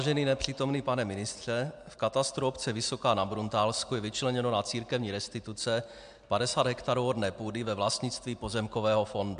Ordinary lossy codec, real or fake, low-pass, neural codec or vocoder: MP3, 64 kbps; real; 9.9 kHz; none